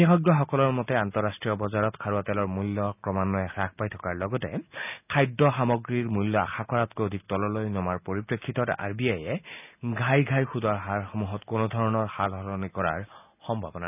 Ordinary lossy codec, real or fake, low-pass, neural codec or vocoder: none; real; 3.6 kHz; none